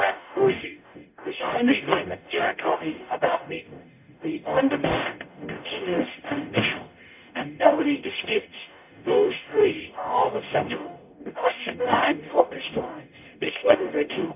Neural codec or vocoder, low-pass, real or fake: codec, 44.1 kHz, 0.9 kbps, DAC; 3.6 kHz; fake